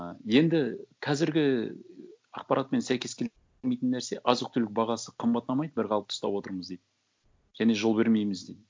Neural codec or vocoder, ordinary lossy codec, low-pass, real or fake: none; none; none; real